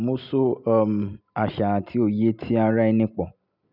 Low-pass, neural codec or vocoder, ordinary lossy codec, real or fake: 5.4 kHz; none; none; real